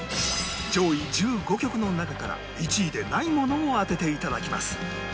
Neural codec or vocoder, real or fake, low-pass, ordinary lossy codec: none; real; none; none